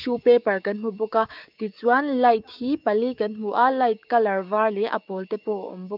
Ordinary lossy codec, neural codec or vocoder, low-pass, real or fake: none; codec, 16 kHz, 6 kbps, DAC; 5.4 kHz; fake